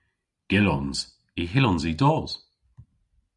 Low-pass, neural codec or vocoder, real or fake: 10.8 kHz; none; real